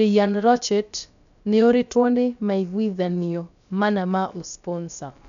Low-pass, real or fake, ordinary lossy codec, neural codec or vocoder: 7.2 kHz; fake; none; codec, 16 kHz, about 1 kbps, DyCAST, with the encoder's durations